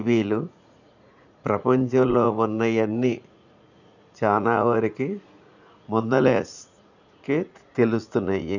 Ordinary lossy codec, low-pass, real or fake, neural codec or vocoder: none; 7.2 kHz; fake; vocoder, 44.1 kHz, 80 mel bands, Vocos